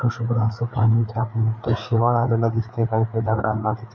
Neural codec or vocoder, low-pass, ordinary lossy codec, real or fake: codec, 16 kHz, 4 kbps, FreqCodec, larger model; 7.2 kHz; none; fake